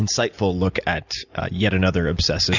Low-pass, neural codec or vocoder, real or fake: 7.2 kHz; none; real